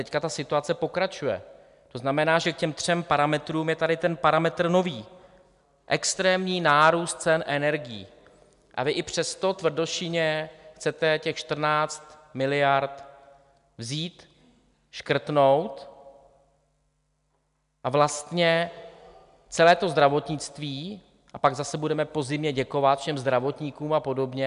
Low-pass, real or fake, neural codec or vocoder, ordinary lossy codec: 10.8 kHz; real; none; MP3, 96 kbps